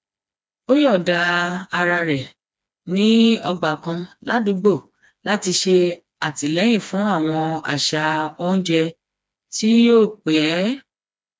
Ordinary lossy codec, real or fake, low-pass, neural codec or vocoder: none; fake; none; codec, 16 kHz, 2 kbps, FreqCodec, smaller model